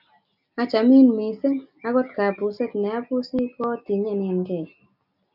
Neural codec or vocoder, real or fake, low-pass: none; real; 5.4 kHz